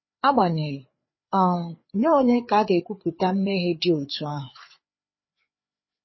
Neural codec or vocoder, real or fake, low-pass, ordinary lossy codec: codec, 16 kHz, 4 kbps, FreqCodec, larger model; fake; 7.2 kHz; MP3, 24 kbps